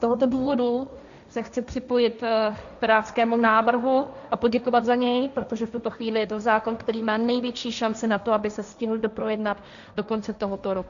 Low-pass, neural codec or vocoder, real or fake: 7.2 kHz; codec, 16 kHz, 1.1 kbps, Voila-Tokenizer; fake